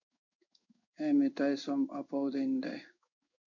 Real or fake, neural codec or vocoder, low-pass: fake; codec, 16 kHz in and 24 kHz out, 1 kbps, XY-Tokenizer; 7.2 kHz